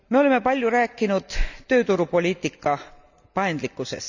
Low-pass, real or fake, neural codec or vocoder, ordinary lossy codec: 7.2 kHz; real; none; none